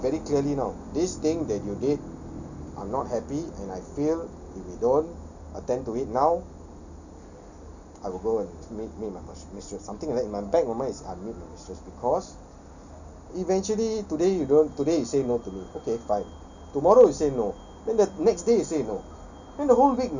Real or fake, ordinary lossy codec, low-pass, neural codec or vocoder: real; none; 7.2 kHz; none